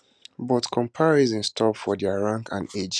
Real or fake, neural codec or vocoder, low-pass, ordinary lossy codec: real; none; none; none